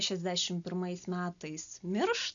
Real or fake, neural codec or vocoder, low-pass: real; none; 7.2 kHz